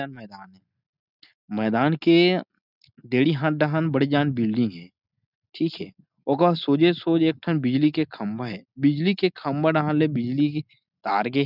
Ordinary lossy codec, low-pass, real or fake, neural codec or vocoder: none; 5.4 kHz; real; none